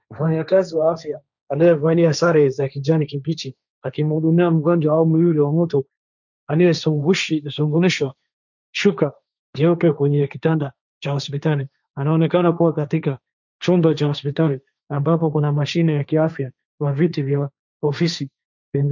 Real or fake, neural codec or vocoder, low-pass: fake; codec, 16 kHz, 1.1 kbps, Voila-Tokenizer; 7.2 kHz